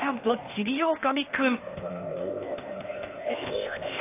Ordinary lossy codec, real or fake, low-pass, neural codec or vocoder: AAC, 24 kbps; fake; 3.6 kHz; codec, 16 kHz, 0.8 kbps, ZipCodec